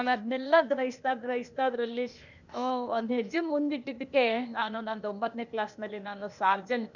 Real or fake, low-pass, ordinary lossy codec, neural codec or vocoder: fake; 7.2 kHz; none; codec, 16 kHz, 0.8 kbps, ZipCodec